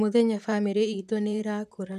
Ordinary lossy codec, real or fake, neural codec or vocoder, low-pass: none; fake; vocoder, 44.1 kHz, 128 mel bands, Pupu-Vocoder; 14.4 kHz